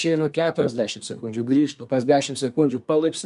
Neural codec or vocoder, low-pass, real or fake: codec, 24 kHz, 1 kbps, SNAC; 10.8 kHz; fake